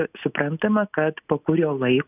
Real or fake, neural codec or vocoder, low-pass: real; none; 3.6 kHz